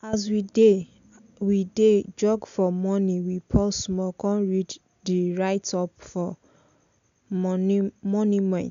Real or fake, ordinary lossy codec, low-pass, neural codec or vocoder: real; none; 7.2 kHz; none